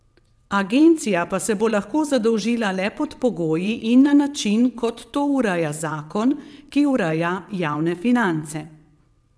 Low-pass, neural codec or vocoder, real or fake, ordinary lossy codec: none; vocoder, 22.05 kHz, 80 mel bands, WaveNeXt; fake; none